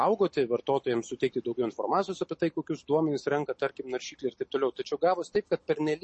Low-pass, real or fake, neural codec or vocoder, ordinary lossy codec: 10.8 kHz; real; none; MP3, 32 kbps